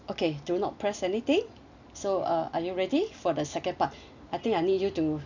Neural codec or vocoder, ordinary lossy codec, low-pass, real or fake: none; none; 7.2 kHz; real